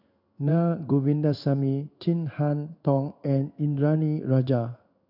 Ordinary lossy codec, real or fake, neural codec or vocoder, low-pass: none; fake; codec, 16 kHz in and 24 kHz out, 1 kbps, XY-Tokenizer; 5.4 kHz